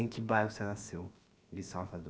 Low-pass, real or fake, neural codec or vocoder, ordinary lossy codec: none; fake; codec, 16 kHz, about 1 kbps, DyCAST, with the encoder's durations; none